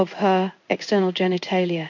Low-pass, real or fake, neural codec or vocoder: 7.2 kHz; fake; codec, 16 kHz in and 24 kHz out, 1 kbps, XY-Tokenizer